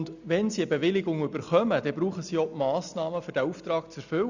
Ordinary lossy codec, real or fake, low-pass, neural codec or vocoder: none; real; 7.2 kHz; none